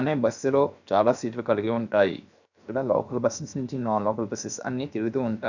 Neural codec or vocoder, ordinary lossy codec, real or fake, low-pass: codec, 16 kHz, 0.7 kbps, FocalCodec; none; fake; 7.2 kHz